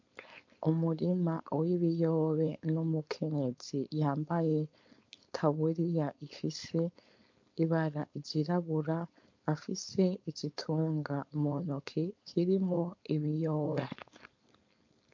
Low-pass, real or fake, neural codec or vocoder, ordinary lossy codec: 7.2 kHz; fake; codec, 16 kHz, 4.8 kbps, FACodec; MP3, 48 kbps